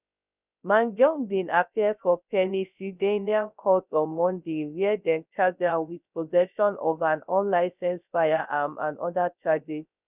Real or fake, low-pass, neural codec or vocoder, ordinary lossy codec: fake; 3.6 kHz; codec, 16 kHz, 0.3 kbps, FocalCodec; none